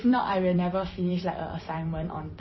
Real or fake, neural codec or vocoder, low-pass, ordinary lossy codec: fake; vocoder, 44.1 kHz, 128 mel bands every 256 samples, BigVGAN v2; 7.2 kHz; MP3, 24 kbps